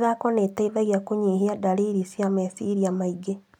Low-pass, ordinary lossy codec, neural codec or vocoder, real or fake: 19.8 kHz; none; none; real